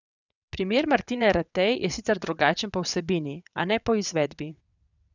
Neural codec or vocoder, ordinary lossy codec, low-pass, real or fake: vocoder, 22.05 kHz, 80 mel bands, WaveNeXt; none; 7.2 kHz; fake